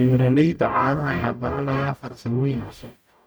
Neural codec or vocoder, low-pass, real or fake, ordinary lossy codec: codec, 44.1 kHz, 0.9 kbps, DAC; none; fake; none